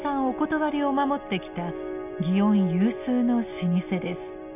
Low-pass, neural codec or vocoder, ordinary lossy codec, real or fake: 3.6 kHz; none; none; real